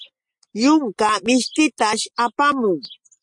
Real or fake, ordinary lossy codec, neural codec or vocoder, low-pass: real; MP3, 48 kbps; none; 10.8 kHz